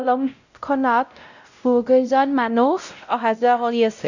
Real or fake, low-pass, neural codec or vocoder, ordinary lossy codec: fake; 7.2 kHz; codec, 16 kHz, 0.5 kbps, X-Codec, WavLM features, trained on Multilingual LibriSpeech; none